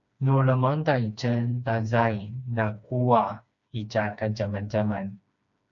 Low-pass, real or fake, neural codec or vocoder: 7.2 kHz; fake; codec, 16 kHz, 2 kbps, FreqCodec, smaller model